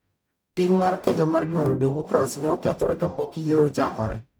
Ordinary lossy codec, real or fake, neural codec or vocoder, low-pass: none; fake; codec, 44.1 kHz, 0.9 kbps, DAC; none